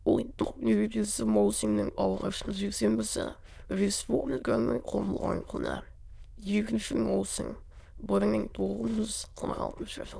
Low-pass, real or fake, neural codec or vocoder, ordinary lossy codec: none; fake; autoencoder, 22.05 kHz, a latent of 192 numbers a frame, VITS, trained on many speakers; none